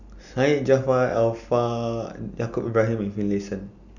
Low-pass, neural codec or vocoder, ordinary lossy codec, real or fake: 7.2 kHz; none; none; real